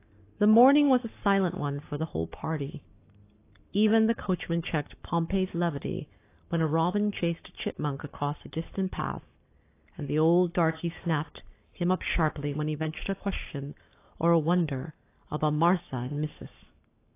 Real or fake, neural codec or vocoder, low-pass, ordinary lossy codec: fake; codec, 44.1 kHz, 7.8 kbps, Pupu-Codec; 3.6 kHz; AAC, 24 kbps